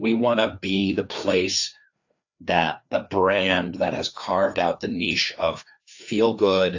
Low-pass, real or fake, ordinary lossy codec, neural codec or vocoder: 7.2 kHz; fake; AAC, 48 kbps; codec, 16 kHz, 2 kbps, FreqCodec, larger model